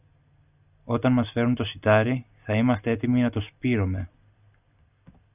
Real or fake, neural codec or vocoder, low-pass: real; none; 3.6 kHz